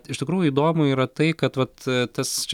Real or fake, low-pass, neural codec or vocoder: real; 19.8 kHz; none